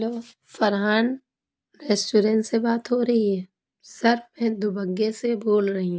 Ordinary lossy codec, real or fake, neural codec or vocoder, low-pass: none; real; none; none